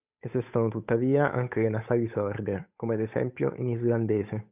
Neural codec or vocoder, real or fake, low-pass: codec, 16 kHz, 8 kbps, FunCodec, trained on Chinese and English, 25 frames a second; fake; 3.6 kHz